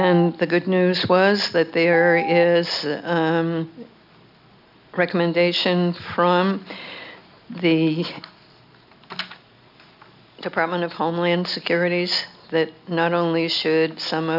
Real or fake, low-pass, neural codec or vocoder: real; 5.4 kHz; none